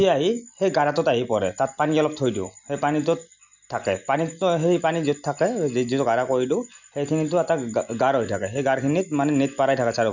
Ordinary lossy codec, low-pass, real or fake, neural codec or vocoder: none; 7.2 kHz; real; none